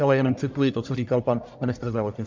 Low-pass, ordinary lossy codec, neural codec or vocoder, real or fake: 7.2 kHz; MP3, 64 kbps; codec, 44.1 kHz, 1.7 kbps, Pupu-Codec; fake